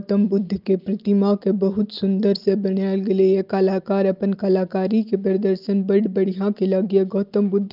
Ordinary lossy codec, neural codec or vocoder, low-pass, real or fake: Opus, 32 kbps; none; 5.4 kHz; real